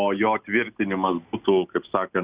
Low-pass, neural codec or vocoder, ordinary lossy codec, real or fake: 3.6 kHz; none; Opus, 64 kbps; real